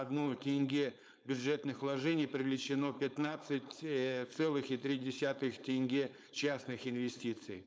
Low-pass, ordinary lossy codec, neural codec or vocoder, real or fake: none; none; codec, 16 kHz, 4.8 kbps, FACodec; fake